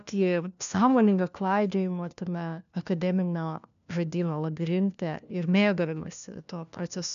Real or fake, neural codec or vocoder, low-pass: fake; codec, 16 kHz, 1 kbps, FunCodec, trained on LibriTTS, 50 frames a second; 7.2 kHz